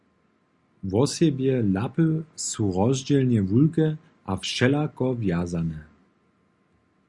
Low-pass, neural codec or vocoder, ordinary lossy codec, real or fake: 10.8 kHz; none; Opus, 64 kbps; real